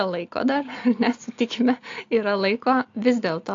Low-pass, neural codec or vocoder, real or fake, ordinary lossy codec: 7.2 kHz; none; real; AAC, 48 kbps